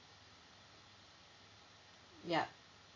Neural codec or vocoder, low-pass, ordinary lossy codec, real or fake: none; 7.2 kHz; MP3, 48 kbps; real